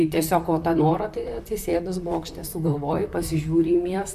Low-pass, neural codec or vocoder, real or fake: 14.4 kHz; vocoder, 44.1 kHz, 128 mel bands, Pupu-Vocoder; fake